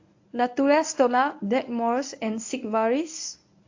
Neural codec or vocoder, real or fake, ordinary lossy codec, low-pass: codec, 24 kHz, 0.9 kbps, WavTokenizer, medium speech release version 1; fake; AAC, 48 kbps; 7.2 kHz